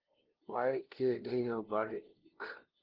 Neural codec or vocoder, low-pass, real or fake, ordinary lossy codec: codec, 16 kHz, 0.5 kbps, FunCodec, trained on LibriTTS, 25 frames a second; 5.4 kHz; fake; Opus, 16 kbps